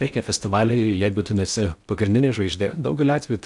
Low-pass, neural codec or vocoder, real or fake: 10.8 kHz; codec, 16 kHz in and 24 kHz out, 0.6 kbps, FocalCodec, streaming, 4096 codes; fake